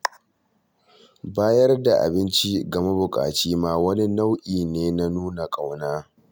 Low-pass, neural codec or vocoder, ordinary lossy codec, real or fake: none; none; none; real